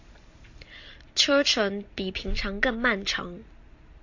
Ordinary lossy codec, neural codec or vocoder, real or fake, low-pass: AAC, 48 kbps; none; real; 7.2 kHz